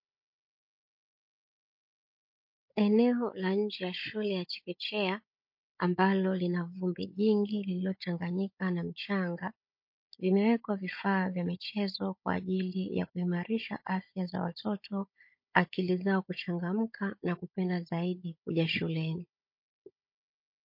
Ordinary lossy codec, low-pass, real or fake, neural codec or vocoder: MP3, 32 kbps; 5.4 kHz; fake; codec, 16 kHz, 16 kbps, FunCodec, trained on Chinese and English, 50 frames a second